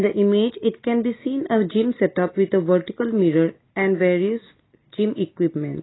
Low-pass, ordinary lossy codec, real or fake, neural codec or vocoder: 7.2 kHz; AAC, 16 kbps; real; none